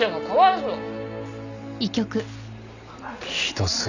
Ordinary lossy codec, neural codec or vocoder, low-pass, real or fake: none; none; 7.2 kHz; real